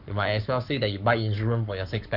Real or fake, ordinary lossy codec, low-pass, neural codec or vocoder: fake; none; 5.4 kHz; codec, 44.1 kHz, 7.8 kbps, Pupu-Codec